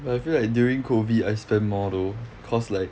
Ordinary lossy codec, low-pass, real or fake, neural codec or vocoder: none; none; real; none